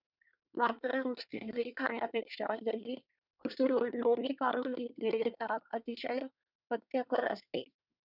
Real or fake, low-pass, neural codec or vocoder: fake; 5.4 kHz; codec, 24 kHz, 1 kbps, SNAC